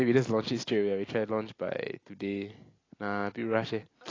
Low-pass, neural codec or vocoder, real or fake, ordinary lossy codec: 7.2 kHz; none; real; AAC, 32 kbps